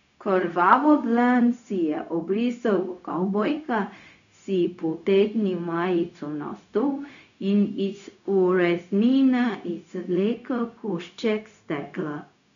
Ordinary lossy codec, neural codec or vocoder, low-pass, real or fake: none; codec, 16 kHz, 0.4 kbps, LongCat-Audio-Codec; 7.2 kHz; fake